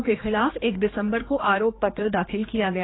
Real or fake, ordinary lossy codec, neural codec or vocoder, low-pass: fake; AAC, 16 kbps; codec, 16 kHz, 2 kbps, X-Codec, HuBERT features, trained on general audio; 7.2 kHz